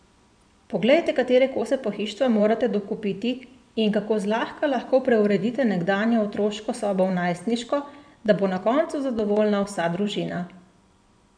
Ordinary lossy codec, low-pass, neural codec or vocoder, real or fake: none; 9.9 kHz; vocoder, 24 kHz, 100 mel bands, Vocos; fake